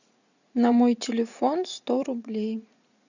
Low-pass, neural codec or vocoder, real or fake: 7.2 kHz; vocoder, 44.1 kHz, 80 mel bands, Vocos; fake